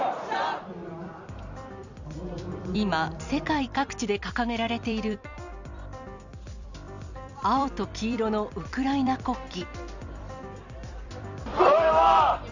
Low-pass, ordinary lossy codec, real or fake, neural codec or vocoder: 7.2 kHz; none; fake; vocoder, 44.1 kHz, 128 mel bands every 256 samples, BigVGAN v2